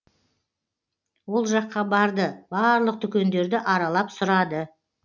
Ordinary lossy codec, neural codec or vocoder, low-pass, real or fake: none; none; 7.2 kHz; real